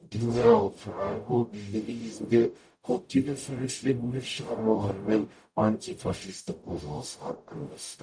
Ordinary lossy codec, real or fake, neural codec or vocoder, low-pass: none; fake; codec, 44.1 kHz, 0.9 kbps, DAC; 9.9 kHz